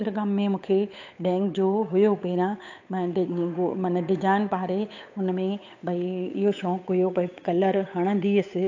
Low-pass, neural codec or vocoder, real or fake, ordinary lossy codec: 7.2 kHz; codec, 16 kHz, 8 kbps, FunCodec, trained on Chinese and English, 25 frames a second; fake; AAC, 48 kbps